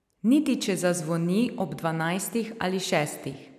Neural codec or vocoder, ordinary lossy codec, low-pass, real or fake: none; none; 14.4 kHz; real